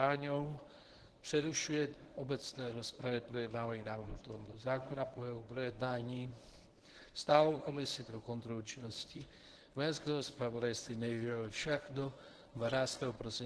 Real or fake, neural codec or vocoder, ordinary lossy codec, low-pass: fake; codec, 24 kHz, 0.9 kbps, WavTokenizer, medium speech release version 1; Opus, 16 kbps; 10.8 kHz